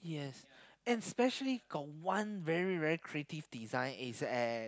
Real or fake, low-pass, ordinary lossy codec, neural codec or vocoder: real; none; none; none